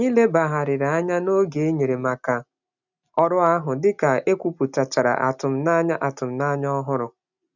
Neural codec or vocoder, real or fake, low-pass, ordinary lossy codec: none; real; 7.2 kHz; none